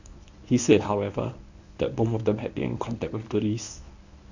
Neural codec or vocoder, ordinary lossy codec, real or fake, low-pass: codec, 24 kHz, 0.9 kbps, WavTokenizer, medium speech release version 1; none; fake; 7.2 kHz